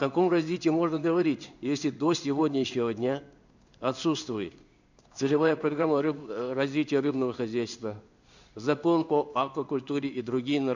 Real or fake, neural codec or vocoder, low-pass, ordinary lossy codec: fake; codec, 16 kHz in and 24 kHz out, 1 kbps, XY-Tokenizer; 7.2 kHz; none